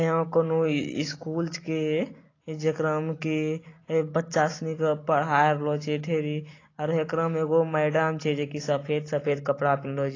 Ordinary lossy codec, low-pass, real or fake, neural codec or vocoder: AAC, 32 kbps; 7.2 kHz; real; none